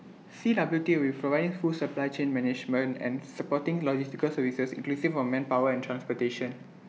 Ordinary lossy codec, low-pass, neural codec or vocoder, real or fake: none; none; none; real